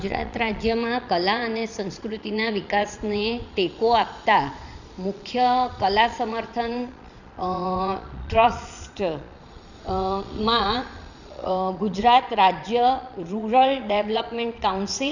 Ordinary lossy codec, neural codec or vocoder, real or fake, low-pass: none; vocoder, 22.05 kHz, 80 mel bands, Vocos; fake; 7.2 kHz